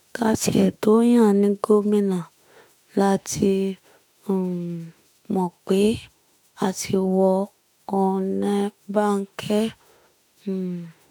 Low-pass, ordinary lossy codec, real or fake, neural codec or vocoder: none; none; fake; autoencoder, 48 kHz, 32 numbers a frame, DAC-VAE, trained on Japanese speech